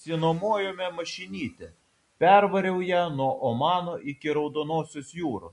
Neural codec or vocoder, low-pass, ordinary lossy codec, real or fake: none; 14.4 kHz; MP3, 48 kbps; real